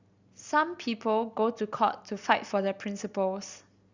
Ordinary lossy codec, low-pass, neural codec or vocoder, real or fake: Opus, 64 kbps; 7.2 kHz; none; real